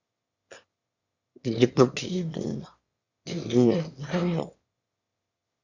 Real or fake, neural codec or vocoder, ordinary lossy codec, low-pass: fake; autoencoder, 22.05 kHz, a latent of 192 numbers a frame, VITS, trained on one speaker; Opus, 64 kbps; 7.2 kHz